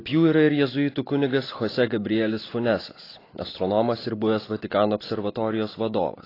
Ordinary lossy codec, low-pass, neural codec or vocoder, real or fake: AAC, 24 kbps; 5.4 kHz; none; real